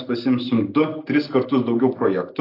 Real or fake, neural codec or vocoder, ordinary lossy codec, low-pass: real; none; AAC, 32 kbps; 5.4 kHz